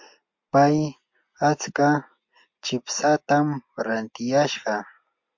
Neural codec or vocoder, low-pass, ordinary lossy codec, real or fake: none; 7.2 kHz; MP3, 48 kbps; real